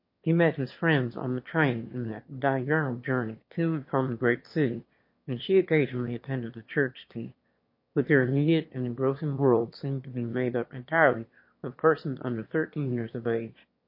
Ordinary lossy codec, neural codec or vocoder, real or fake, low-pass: MP3, 32 kbps; autoencoder, 22.05 kHz, a latent of 192 numbers a frame, VITS, trained on one speaker; fake; 5.4 kHz